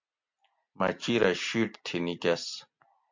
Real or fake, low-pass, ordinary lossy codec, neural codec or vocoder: real; 7.2 kHz; MP3, 64 kbps; none